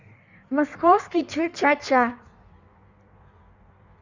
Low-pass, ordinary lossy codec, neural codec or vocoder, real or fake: 7.2 kHz; Opus, 64 kbps; codec, 16 kHz in and 24 kHz out, 1.1 kbps, FireRedTTS-2 codec; fake